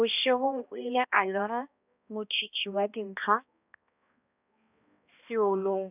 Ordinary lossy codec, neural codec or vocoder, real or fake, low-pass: none; codec, 16 kHz, 1 kbps, X-Codec, HuBERT features, trained on balanced general audio; fake; 3.6 kHz